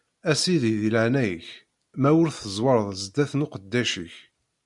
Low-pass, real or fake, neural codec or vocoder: 10.8 kHz; real; none